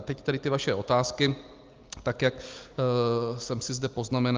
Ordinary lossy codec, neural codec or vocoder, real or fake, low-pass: Opus, 24 kbps; none; real; 7.2 kHz